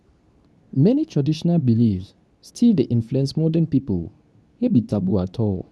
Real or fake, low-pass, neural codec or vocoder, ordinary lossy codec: fake; none; codec, 24 kHz, 0.9 kbps, WavTokenizer, medium speech release version 2; none